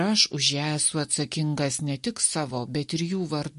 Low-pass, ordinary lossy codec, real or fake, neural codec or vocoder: 14.4 kHz; MP3, 48 kbps; real; none